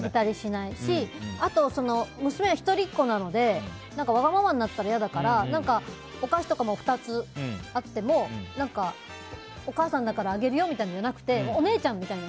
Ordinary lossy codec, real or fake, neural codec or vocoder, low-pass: none; real; none; none